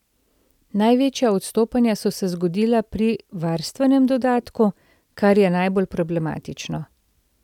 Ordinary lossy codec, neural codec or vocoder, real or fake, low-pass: none; none; real; 19.8 kHz